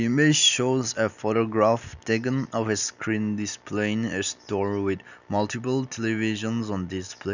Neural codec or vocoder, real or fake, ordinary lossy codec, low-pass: none; real; none; 7.2 kHz